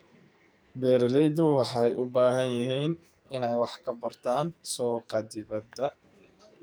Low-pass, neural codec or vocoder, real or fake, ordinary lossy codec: none; codec, 44.1 kHz, 2.6 kbps, SNAC; fake; none